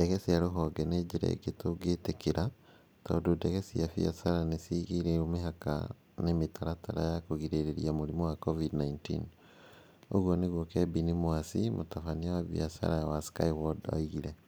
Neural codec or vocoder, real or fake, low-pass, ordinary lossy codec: none; real; none; none